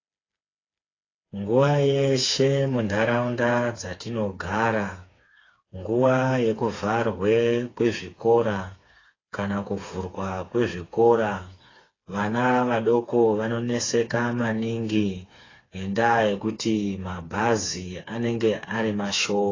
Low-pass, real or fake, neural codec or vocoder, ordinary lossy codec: 7.2 kHz; fake; codec, 16 kHz, 4 kbps, FreqCodec, smaller model; AAC, 32 kbps